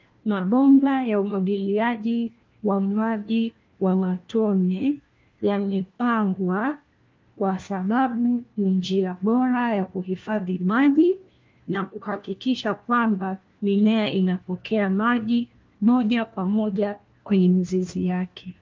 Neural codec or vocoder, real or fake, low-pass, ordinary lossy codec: codec, 16 kHz, 1 kbps, FunCodec, trained on LibriTTS, 50 frames a second; fake; 7.2 kHz; Opus, 32 kbps